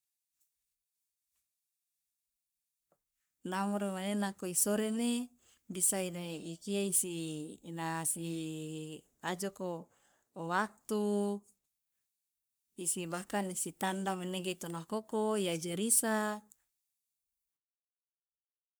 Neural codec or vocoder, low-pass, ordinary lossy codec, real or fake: codec, 44.1 kHz, 3.4 kbps, Pupu-Codec; none; none; fake